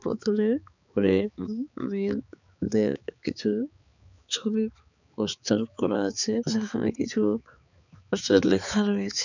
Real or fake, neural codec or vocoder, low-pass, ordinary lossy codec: fake; codec, 16 kHz, 4 kbps, X-Codec, HuBERT features, trained on balanced general audio; 7.2 kHz; none